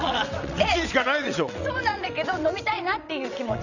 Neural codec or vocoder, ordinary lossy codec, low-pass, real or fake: vocoder, 22.05 kHz, 80 mel bands, WaveNeXt; none; 7.2 kHz; fake